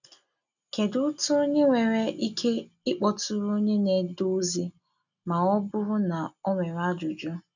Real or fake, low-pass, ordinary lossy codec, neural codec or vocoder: real; 7.2 kHz; none; none